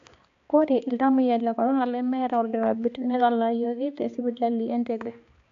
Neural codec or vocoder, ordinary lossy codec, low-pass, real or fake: codec, 16 kHz, 2 kbps, X-Codec, HuBERT features, trained on balanced general audio; AAC, 48 kbps; 7.2 kHz; fake